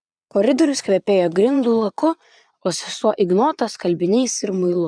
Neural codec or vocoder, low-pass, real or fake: vocoder, 22.05 kHz, 80 mel bands, Vocos; 9.9 kHz; fake